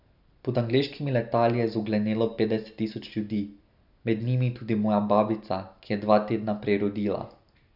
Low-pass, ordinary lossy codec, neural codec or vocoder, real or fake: 5.4 kHz; none; none; real